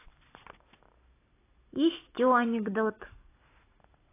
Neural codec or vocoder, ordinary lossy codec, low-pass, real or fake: none; AAC, 16 kbps; 3.6 kHz; real